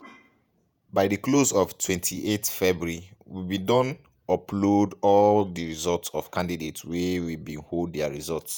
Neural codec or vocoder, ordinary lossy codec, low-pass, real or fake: vocoder, 48 kHz, 128 mel bands, Vocos; none; none; fake